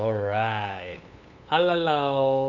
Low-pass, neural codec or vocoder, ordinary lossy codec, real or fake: 7.2 kHz; codec, 16 kHz, 8 kbps, FunCodec, trained on LibriTTS, 25 frames a second; none; fake